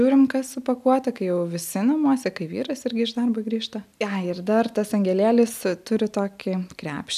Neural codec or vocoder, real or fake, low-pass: none; real; 14.4 kHz